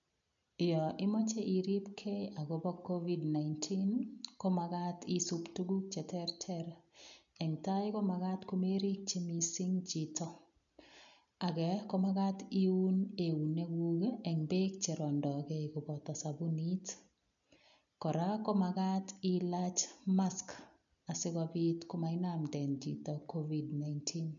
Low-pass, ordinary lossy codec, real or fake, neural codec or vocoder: 7.2 kHz; none; real; none